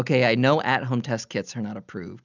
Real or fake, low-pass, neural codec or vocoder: real; 7.2 kHz; none